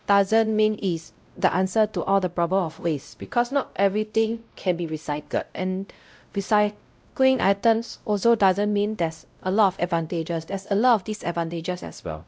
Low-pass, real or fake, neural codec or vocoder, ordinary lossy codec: none; fake; codec, 16 kHz, 0.5 kbps, X-Codec, WavLM features, trained on Multilingual LibriSpeech; none